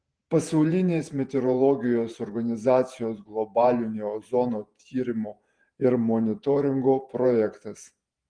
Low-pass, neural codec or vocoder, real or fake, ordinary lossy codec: 9.9 kHz; vocoder, 48 kHz, 128 mel bands, Vocos; fake; Opus, 24 kbps